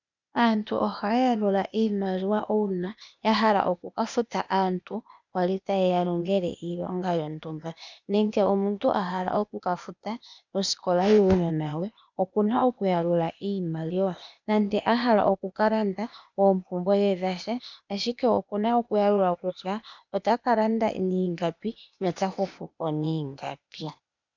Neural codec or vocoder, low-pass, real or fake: codec, 16 kHz, 0.8 kbps, ZipCodec; 7.2 kHz; fake